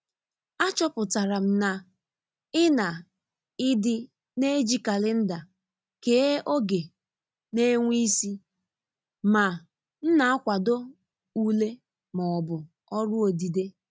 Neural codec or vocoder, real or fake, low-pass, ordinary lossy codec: none; real; none; none